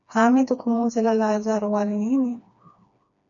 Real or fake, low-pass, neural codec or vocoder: fake; 7.2 kHz; codec, 16 kHz, 2 kbps, FreqCodec, smaller model